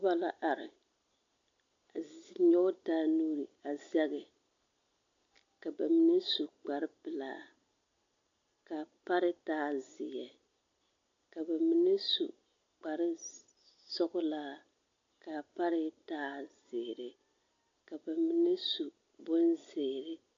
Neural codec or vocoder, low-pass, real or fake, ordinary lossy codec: none; 7.2 kHz; real; AAC, 64 kbps